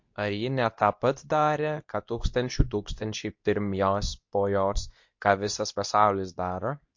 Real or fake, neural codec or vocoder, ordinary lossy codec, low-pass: fake; codec, 24 kHz, 0.9 kbps, WavTokenizer, medium speech release version 2; MP3, 48 kbps; 7.2 kHz